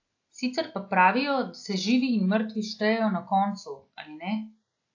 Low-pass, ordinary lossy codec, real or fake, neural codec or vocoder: 7.2 kHz; AAC, 48 kbps; real; none